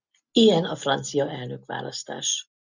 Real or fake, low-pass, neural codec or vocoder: real; 7.2 kHz; none